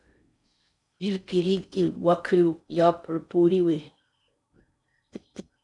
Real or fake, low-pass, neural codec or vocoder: fake; 10.8 kHz; codec, 16 kHz in and 24 kHz out, 0.6 kbps, FocalCodec, streaming, 4096 codes